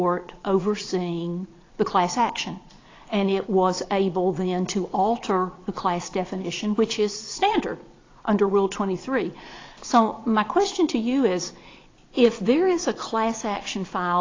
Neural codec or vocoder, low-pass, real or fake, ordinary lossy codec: vocoder, 22.05 kHz, 80 mel bands, Vocos; 7.2 kHz; fake; AAC, 32 kbps